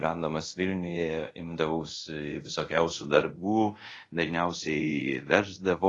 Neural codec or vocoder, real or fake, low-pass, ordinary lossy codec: codec, 24 kHz, 0.5 kbps, DualCodec; fake; 10.8 kHz; AAC, 32 kbps